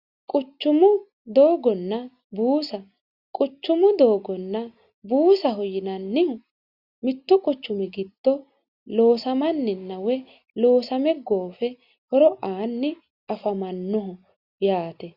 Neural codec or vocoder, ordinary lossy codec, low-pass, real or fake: none; Opus, 64 kbps; 5.4 kHz; real